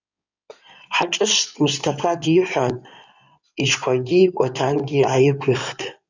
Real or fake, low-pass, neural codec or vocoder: fake; 7.2 kHz; codec, 16 kHz in and 24 kHz out, 2.2 kbps, FireRedTTS-2 codec